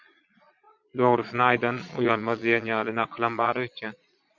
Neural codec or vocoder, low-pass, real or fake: vocoder, 22.05 kHz, 80 mel bands, Vocos; 7.2 kHz; fake